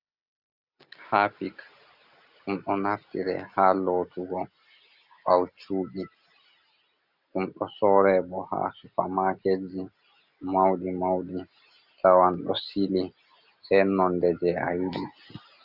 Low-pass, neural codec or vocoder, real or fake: 5.4 kHz; none; real